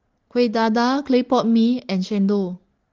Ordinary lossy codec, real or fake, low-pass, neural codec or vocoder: Opus, 16 kbps; real; 7.2 kHz; none